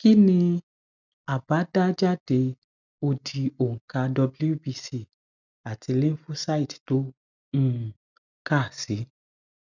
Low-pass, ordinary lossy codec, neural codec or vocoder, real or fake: 7.2 kHz; none; none; real